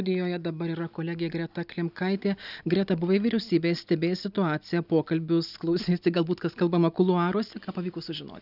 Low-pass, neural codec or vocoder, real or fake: 5.4 kHz; none; real